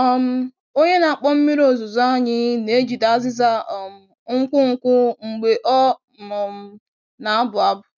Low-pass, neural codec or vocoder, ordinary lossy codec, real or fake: 7.2 kHz; none; none; real